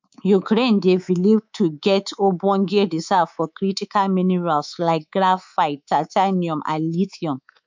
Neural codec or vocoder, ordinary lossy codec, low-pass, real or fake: codec, 24 kHz, 3.1 kbps, DualCodec; MP3, 64 kbps; 7.2 kHz; fake